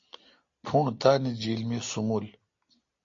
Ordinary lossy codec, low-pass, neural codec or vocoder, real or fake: AAC, 48 kbps; 7.2 kHz; none; real